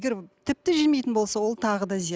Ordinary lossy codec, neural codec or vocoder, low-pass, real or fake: none; none; none; real